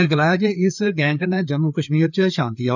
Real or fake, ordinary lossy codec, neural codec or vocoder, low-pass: fake; none; codec, 16 kHz, 4 kbps, FreqCodec, larger model; 7.2 kHz